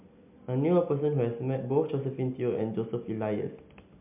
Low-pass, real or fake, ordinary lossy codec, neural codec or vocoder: 3.6 kHz; real; MP3, 32 kbps; none